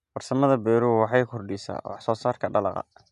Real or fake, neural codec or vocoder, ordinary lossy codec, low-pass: real; none; none; 10.8 kHz